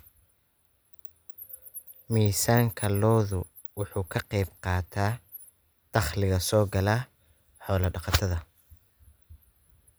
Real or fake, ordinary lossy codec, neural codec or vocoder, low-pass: real; none; none; none